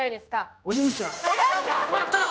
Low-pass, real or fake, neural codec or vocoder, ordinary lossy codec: none; fake; codec, 16 kHz, 1 kbps, X-Codec, HuBERT features, trained on general audio; none